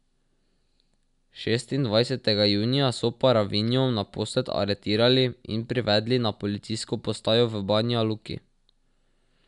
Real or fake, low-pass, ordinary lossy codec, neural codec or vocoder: real; 10.8 kHz; none; none